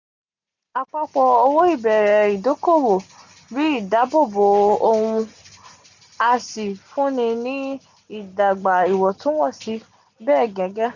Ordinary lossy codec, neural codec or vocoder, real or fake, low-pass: none; none; real; 7.2 kHz